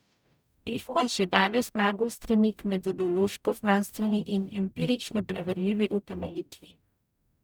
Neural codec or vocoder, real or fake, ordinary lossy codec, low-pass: codec, 44.1 kHz, 0.9 kbps, DAC; fake; none; none